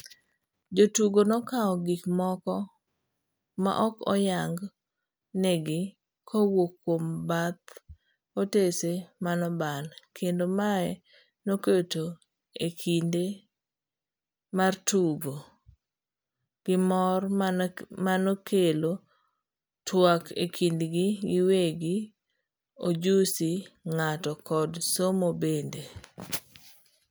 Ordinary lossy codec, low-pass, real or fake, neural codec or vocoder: none; none; real; none